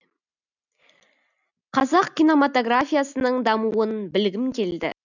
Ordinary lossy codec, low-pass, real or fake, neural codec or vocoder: none; 7.2 kHz; real; none